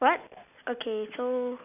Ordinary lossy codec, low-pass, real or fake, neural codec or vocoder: none; 3.6 kHz; real; none